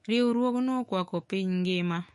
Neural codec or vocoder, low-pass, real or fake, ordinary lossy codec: autoencoder, 48 kHz, 128 numbers a frame, DAC-VAE, trained on Japanese speech; 14.4 kHz; fake; MP3, 48 kbps